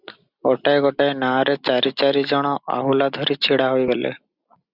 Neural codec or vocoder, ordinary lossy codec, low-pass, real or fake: none; Opus, 64 kbps; 5.4 kHz; real